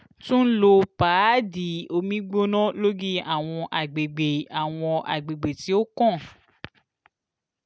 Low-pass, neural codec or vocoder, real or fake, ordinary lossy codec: none; none; real; none